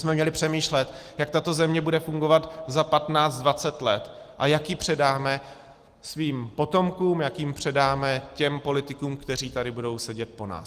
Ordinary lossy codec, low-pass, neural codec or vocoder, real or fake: Opus, 24 kbps; 14.4 kHz; none; real